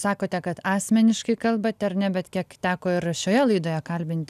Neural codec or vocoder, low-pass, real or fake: none; 14.4 kHz; real